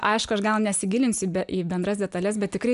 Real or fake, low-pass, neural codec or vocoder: real; 10.8 kHz; none